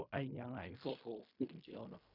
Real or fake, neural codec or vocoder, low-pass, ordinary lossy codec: fake; codec, 16 kHz in and 24 kHz out, 0.4 kbps, LongCat-Audio-Codec, fine tuned four codebook decoder; 5.4 kHz; none